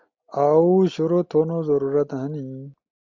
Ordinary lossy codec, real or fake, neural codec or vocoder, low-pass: MP3, 64 kbps; real; none; 7.2 kHz